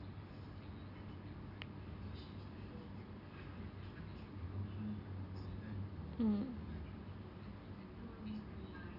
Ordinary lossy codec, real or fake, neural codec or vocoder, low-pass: none; real; none; 5.4 kHz